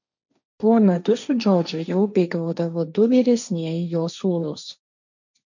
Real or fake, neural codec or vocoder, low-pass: fake; codec, 16 kHz, 1.1 kbps, Voila-Tokenizer; 7.2 kHz